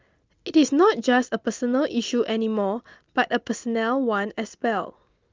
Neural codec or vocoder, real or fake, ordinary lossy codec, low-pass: none; real; Opus, 32 kbps; 7.2 kHz